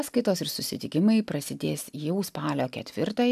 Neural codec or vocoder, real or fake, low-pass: none; real; 14.4 kHz